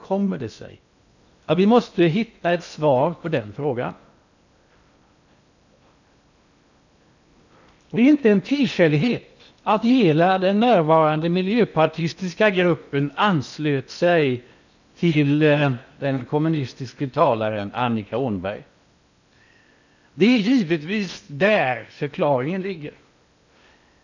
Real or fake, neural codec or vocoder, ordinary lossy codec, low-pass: fake; codec, 16 kHz in and 24 kHz out, 0.8 kbps, FocalCodec, streaming, 65536 codes; none; 7.2 kHz